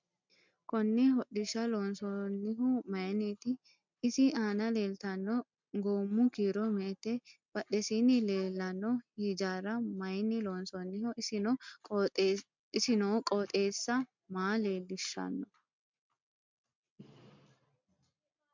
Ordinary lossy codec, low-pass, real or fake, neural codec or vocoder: MP3, 64 kbps; 7.2 kHz; real; none